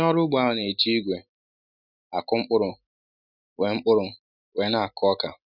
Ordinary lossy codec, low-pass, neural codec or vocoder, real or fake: none; 5.4 kHz; none; real